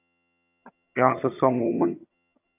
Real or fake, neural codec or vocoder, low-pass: fake; vocoder, 22.05 kHz, 80 mel bands, HiFi-GAN; 3.6 kHz